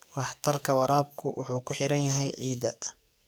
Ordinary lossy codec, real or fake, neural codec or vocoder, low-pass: none; fake; codec, 44.1 kHz, 2.6 kbps, SNAC; none